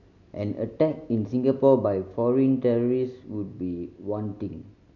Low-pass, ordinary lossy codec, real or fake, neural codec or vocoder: 7.2 kHz; none; real; none